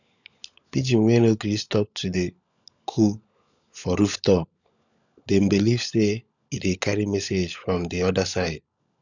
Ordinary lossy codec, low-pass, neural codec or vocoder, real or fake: none; 7.2 kHz; codec, 16 kHz, 16 kbps, FunCodec, trained on LibriTTS, 50 frames a second; fake